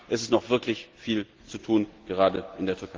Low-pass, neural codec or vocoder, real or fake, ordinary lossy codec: 7.2 kHz; none; real; Opus, 16 kbps